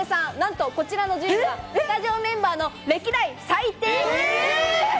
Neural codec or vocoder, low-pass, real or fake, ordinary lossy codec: none; none; real; none